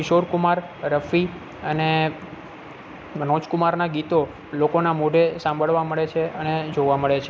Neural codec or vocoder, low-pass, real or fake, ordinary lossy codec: none; none; real; none